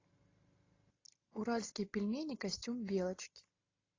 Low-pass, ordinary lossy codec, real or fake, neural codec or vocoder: 7.2 kHz; AAC, 32 kbps; real; none